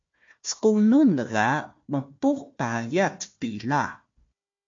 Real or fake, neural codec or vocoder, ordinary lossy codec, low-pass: fake; codec, 16 kHz, 1 kbps, FunCodec, trained on Chinese and English, 50 frames a second; MP3, 48 kbps; 7.2 kHz